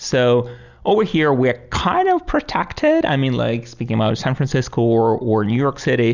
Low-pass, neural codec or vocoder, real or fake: 7.2 kHz; none; real